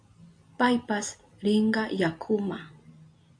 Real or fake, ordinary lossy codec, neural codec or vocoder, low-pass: real; AAC, 64 kbps; none; 9.9 kHz